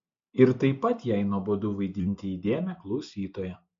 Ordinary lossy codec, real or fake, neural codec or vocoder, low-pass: MP3, 48 kbps; real; none; 7.2 kHz